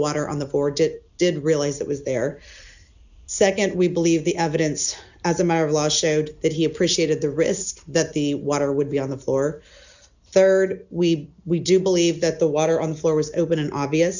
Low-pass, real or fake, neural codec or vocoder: 7.2 kHz; real; none